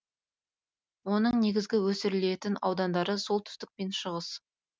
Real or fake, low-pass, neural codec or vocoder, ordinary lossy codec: real; none; none; none